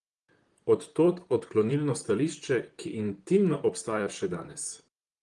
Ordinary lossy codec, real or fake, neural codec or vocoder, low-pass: Opus, 24 kbps; fake; vocoder, 44.1 kHz, 128 mel bands, Pupu-Vocoder; 10.8 kHz